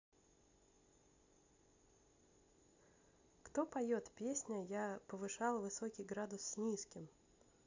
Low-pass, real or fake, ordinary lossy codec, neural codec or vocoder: 7.2 kHz; real; AAC, 48 kbps; none